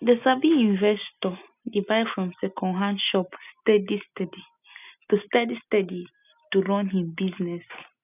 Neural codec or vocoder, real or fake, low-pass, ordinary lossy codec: none; real; 3.6 kHz; none